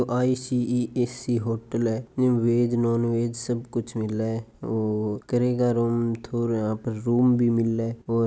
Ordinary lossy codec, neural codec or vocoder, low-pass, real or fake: none; none; none; real